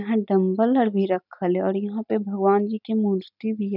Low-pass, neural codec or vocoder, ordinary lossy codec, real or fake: 5.4 kHz; none; none; real